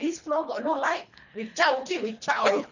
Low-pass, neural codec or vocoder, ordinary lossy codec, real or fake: 7.2 kHz; codec, 24 kHz, 3 kbps, HILCodec; none; fake